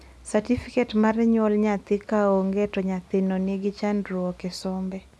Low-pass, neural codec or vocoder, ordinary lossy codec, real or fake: none; none; none; real